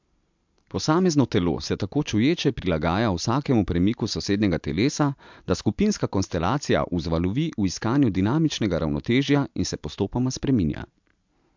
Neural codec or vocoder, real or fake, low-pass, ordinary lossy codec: none; real; 7.2 kHz; MP3, 64 kbps